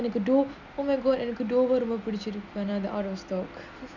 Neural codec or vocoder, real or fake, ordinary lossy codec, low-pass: none; real; none; 7.2 kHz